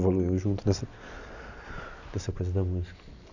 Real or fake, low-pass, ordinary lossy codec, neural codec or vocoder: real; 7.2 kHz; none; none